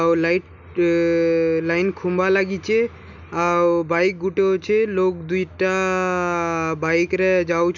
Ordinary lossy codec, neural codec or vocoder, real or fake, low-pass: none; none; real; 7.2 kHz